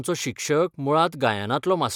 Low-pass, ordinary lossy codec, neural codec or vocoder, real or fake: 19.8 kHz; none; none; real